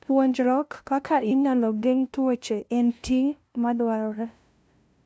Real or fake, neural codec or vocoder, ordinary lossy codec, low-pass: fake; codec, 16 kHz, 0.5 kbps, FunCodec, trained on LibriTTS, 25 frames a second; none; none